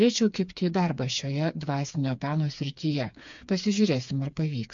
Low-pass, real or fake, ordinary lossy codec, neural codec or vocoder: 7.2 kHz; fake; AAC, 64 kbps; codec, 16 kHz, 4 kbps, FreqCodec, smaller model